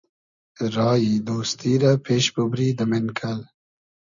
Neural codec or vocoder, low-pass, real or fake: none; 7.2 kHz; real